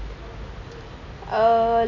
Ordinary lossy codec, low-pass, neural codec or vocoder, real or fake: none; 7.2 kHz; none; real